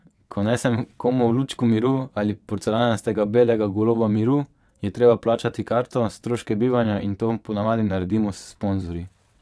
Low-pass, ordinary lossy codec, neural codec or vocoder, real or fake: none; none; vocoder, 22.05 kHz, 80 mel bands, WaveNeXt; fake